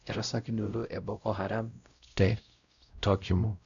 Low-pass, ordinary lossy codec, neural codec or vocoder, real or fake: 7.2 kHz; none; codec, 16 kHz, 0.5 kbps, X-Codec, WavLM features, trained on Multilingual LibriSpeech; fake